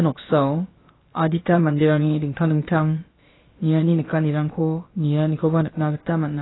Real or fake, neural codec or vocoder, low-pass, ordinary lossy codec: fake; codec, 16 kHz, about 1 kbps, DyCAST, with the encoder's durations; 7.2 kHz; AAC, 16 kbps